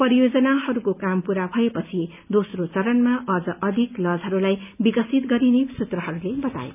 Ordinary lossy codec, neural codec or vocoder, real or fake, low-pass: none; none; real; 3.6 kHz